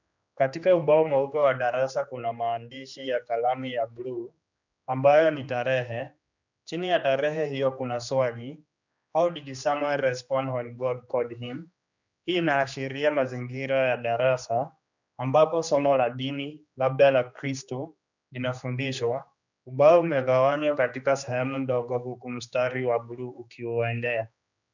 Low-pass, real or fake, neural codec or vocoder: 7.2 kHz; fake; codec, 16 kHz, 2 kbps, X-Codec, HuBERT features, trained on general audio